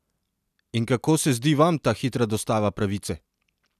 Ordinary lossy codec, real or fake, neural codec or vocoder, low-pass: none; real; none; 14.4 kHz